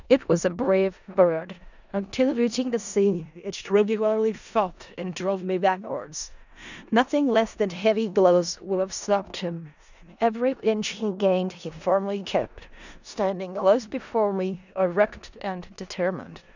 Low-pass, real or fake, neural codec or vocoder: 7.2 kHz; fake; codec, 16 kHz in and 24 kHz out, 0.4 kbps, LongCat-Audio-Codec, four codebook decoder